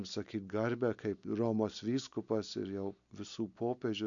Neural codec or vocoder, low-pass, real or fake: none; 7.2 kHz; real